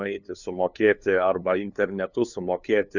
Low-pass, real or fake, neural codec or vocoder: 7.2 kHz; fake; codec, 16 kHz, 2 kbps, FunCodec, trained on LibriTTS, 25 frames a second